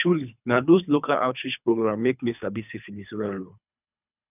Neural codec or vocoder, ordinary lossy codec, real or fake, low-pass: codec, 24 kHz, 3 kbps, HILCodec; none; fake; 3.6 kHz